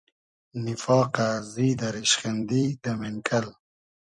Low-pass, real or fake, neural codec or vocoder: 10.8 kHz; fake; vocoder, 44.1 kHz, 128 mel bands every 256 samples, BigVGAN v2